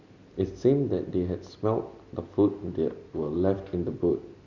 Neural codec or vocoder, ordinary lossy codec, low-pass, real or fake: none; none; 7.2 kHz; real